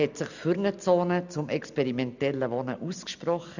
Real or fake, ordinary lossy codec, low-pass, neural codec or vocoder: real; none; 7.2 kHz; none